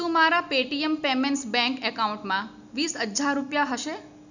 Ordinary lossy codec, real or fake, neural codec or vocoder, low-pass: none; real; none; 7.2 kHz